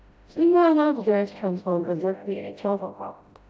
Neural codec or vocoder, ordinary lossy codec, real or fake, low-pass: codec, 16 kHz, 0.5 kbps, FreqCodec, smaller model; none; fake; none